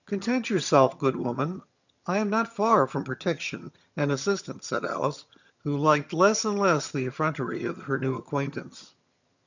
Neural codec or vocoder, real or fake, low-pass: vocoder, 22.05 kHz, 80 mel bands, HiFi-GAN; fake; 7.2 kHz